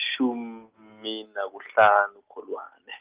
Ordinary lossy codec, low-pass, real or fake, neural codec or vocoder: Opus, 24 kbps; 3.6 kHz; real; none